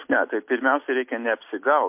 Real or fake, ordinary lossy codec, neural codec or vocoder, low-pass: real; MP3, 32 kbps; none; 3.6 kHz